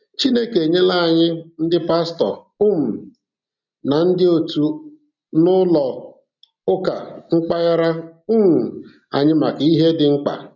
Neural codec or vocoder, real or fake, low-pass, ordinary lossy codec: none; real; 7.2 kHz; none